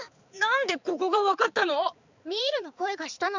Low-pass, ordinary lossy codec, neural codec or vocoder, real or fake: 7.2 kHz; Opus, 64 kbps; codec, 16 kHz, 4 kbps, X-Codec, HuBERT features, trained on balanced general audio; fake